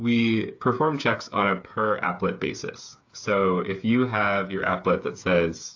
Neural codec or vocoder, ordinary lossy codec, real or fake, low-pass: codec, 16 kHz, 8 kbps, FreqCodec, smaller model; AAC, 48 kbps; fake; 7.2 kHz